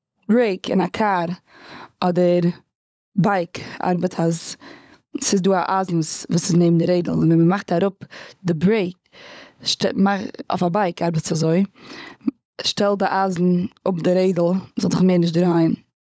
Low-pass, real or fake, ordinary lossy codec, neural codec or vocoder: none; fake; none; codec, 16 kHz, 16 kbps, FunCodec, trained on LibriTTS, 50 frames a second